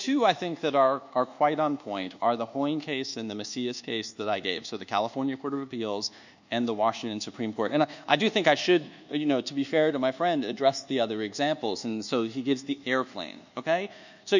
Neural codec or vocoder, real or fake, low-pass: codec, 24 kHz, 1.2 kbps, DualCodec; fake; 7.2 kHz